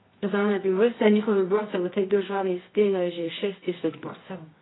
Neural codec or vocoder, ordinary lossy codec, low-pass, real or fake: codec, 24 kHz, 0.9 kbps, WavTokenizer, medium music audio release; AAC, 16 kbps; 7.2 kHz; fake